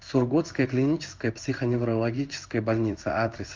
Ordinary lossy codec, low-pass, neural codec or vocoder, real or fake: Opus, 24 kbps; 7.2 kHz; codec, 16 kHz in and 24 kHz out, 1 kbps, XY-Tokenizer; fake